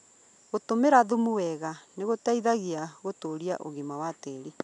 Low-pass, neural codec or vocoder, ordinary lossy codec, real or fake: 10.8 kHz; none; none; real